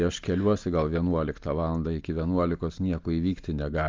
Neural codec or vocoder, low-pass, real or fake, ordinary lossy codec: none; 7.2 kHz; real; Opus, 32 kbps